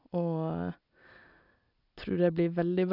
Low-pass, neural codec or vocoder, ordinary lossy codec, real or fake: 5.4 kHz; none; none; real